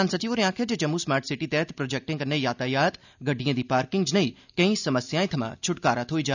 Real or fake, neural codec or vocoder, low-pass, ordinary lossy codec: real; none; 7.2 kHz; none